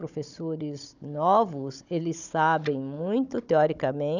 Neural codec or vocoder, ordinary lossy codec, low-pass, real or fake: codec, 16 kHz, 16 kbps, FunCodec, trained on Chinese and English, 50 frames a second; none; 7.2 kHz; fake